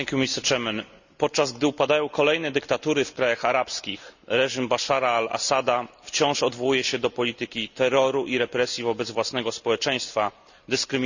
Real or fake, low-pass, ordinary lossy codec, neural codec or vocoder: real; 7.2 kHz; none; none